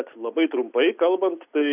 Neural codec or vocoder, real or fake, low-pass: none; real; 3.6 kHz